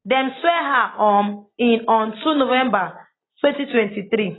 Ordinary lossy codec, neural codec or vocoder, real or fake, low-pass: AAC, 16 kbps; none; real; 7.2 kHz